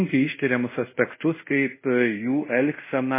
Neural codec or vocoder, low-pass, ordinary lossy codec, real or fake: codec, 24 kHz, 0.5 kbps, DualCodec; 3.6 kHz; MP3, 16 kbps; fake